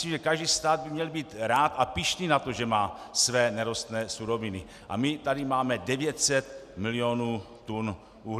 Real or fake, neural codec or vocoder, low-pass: real; none; 14.4 kHz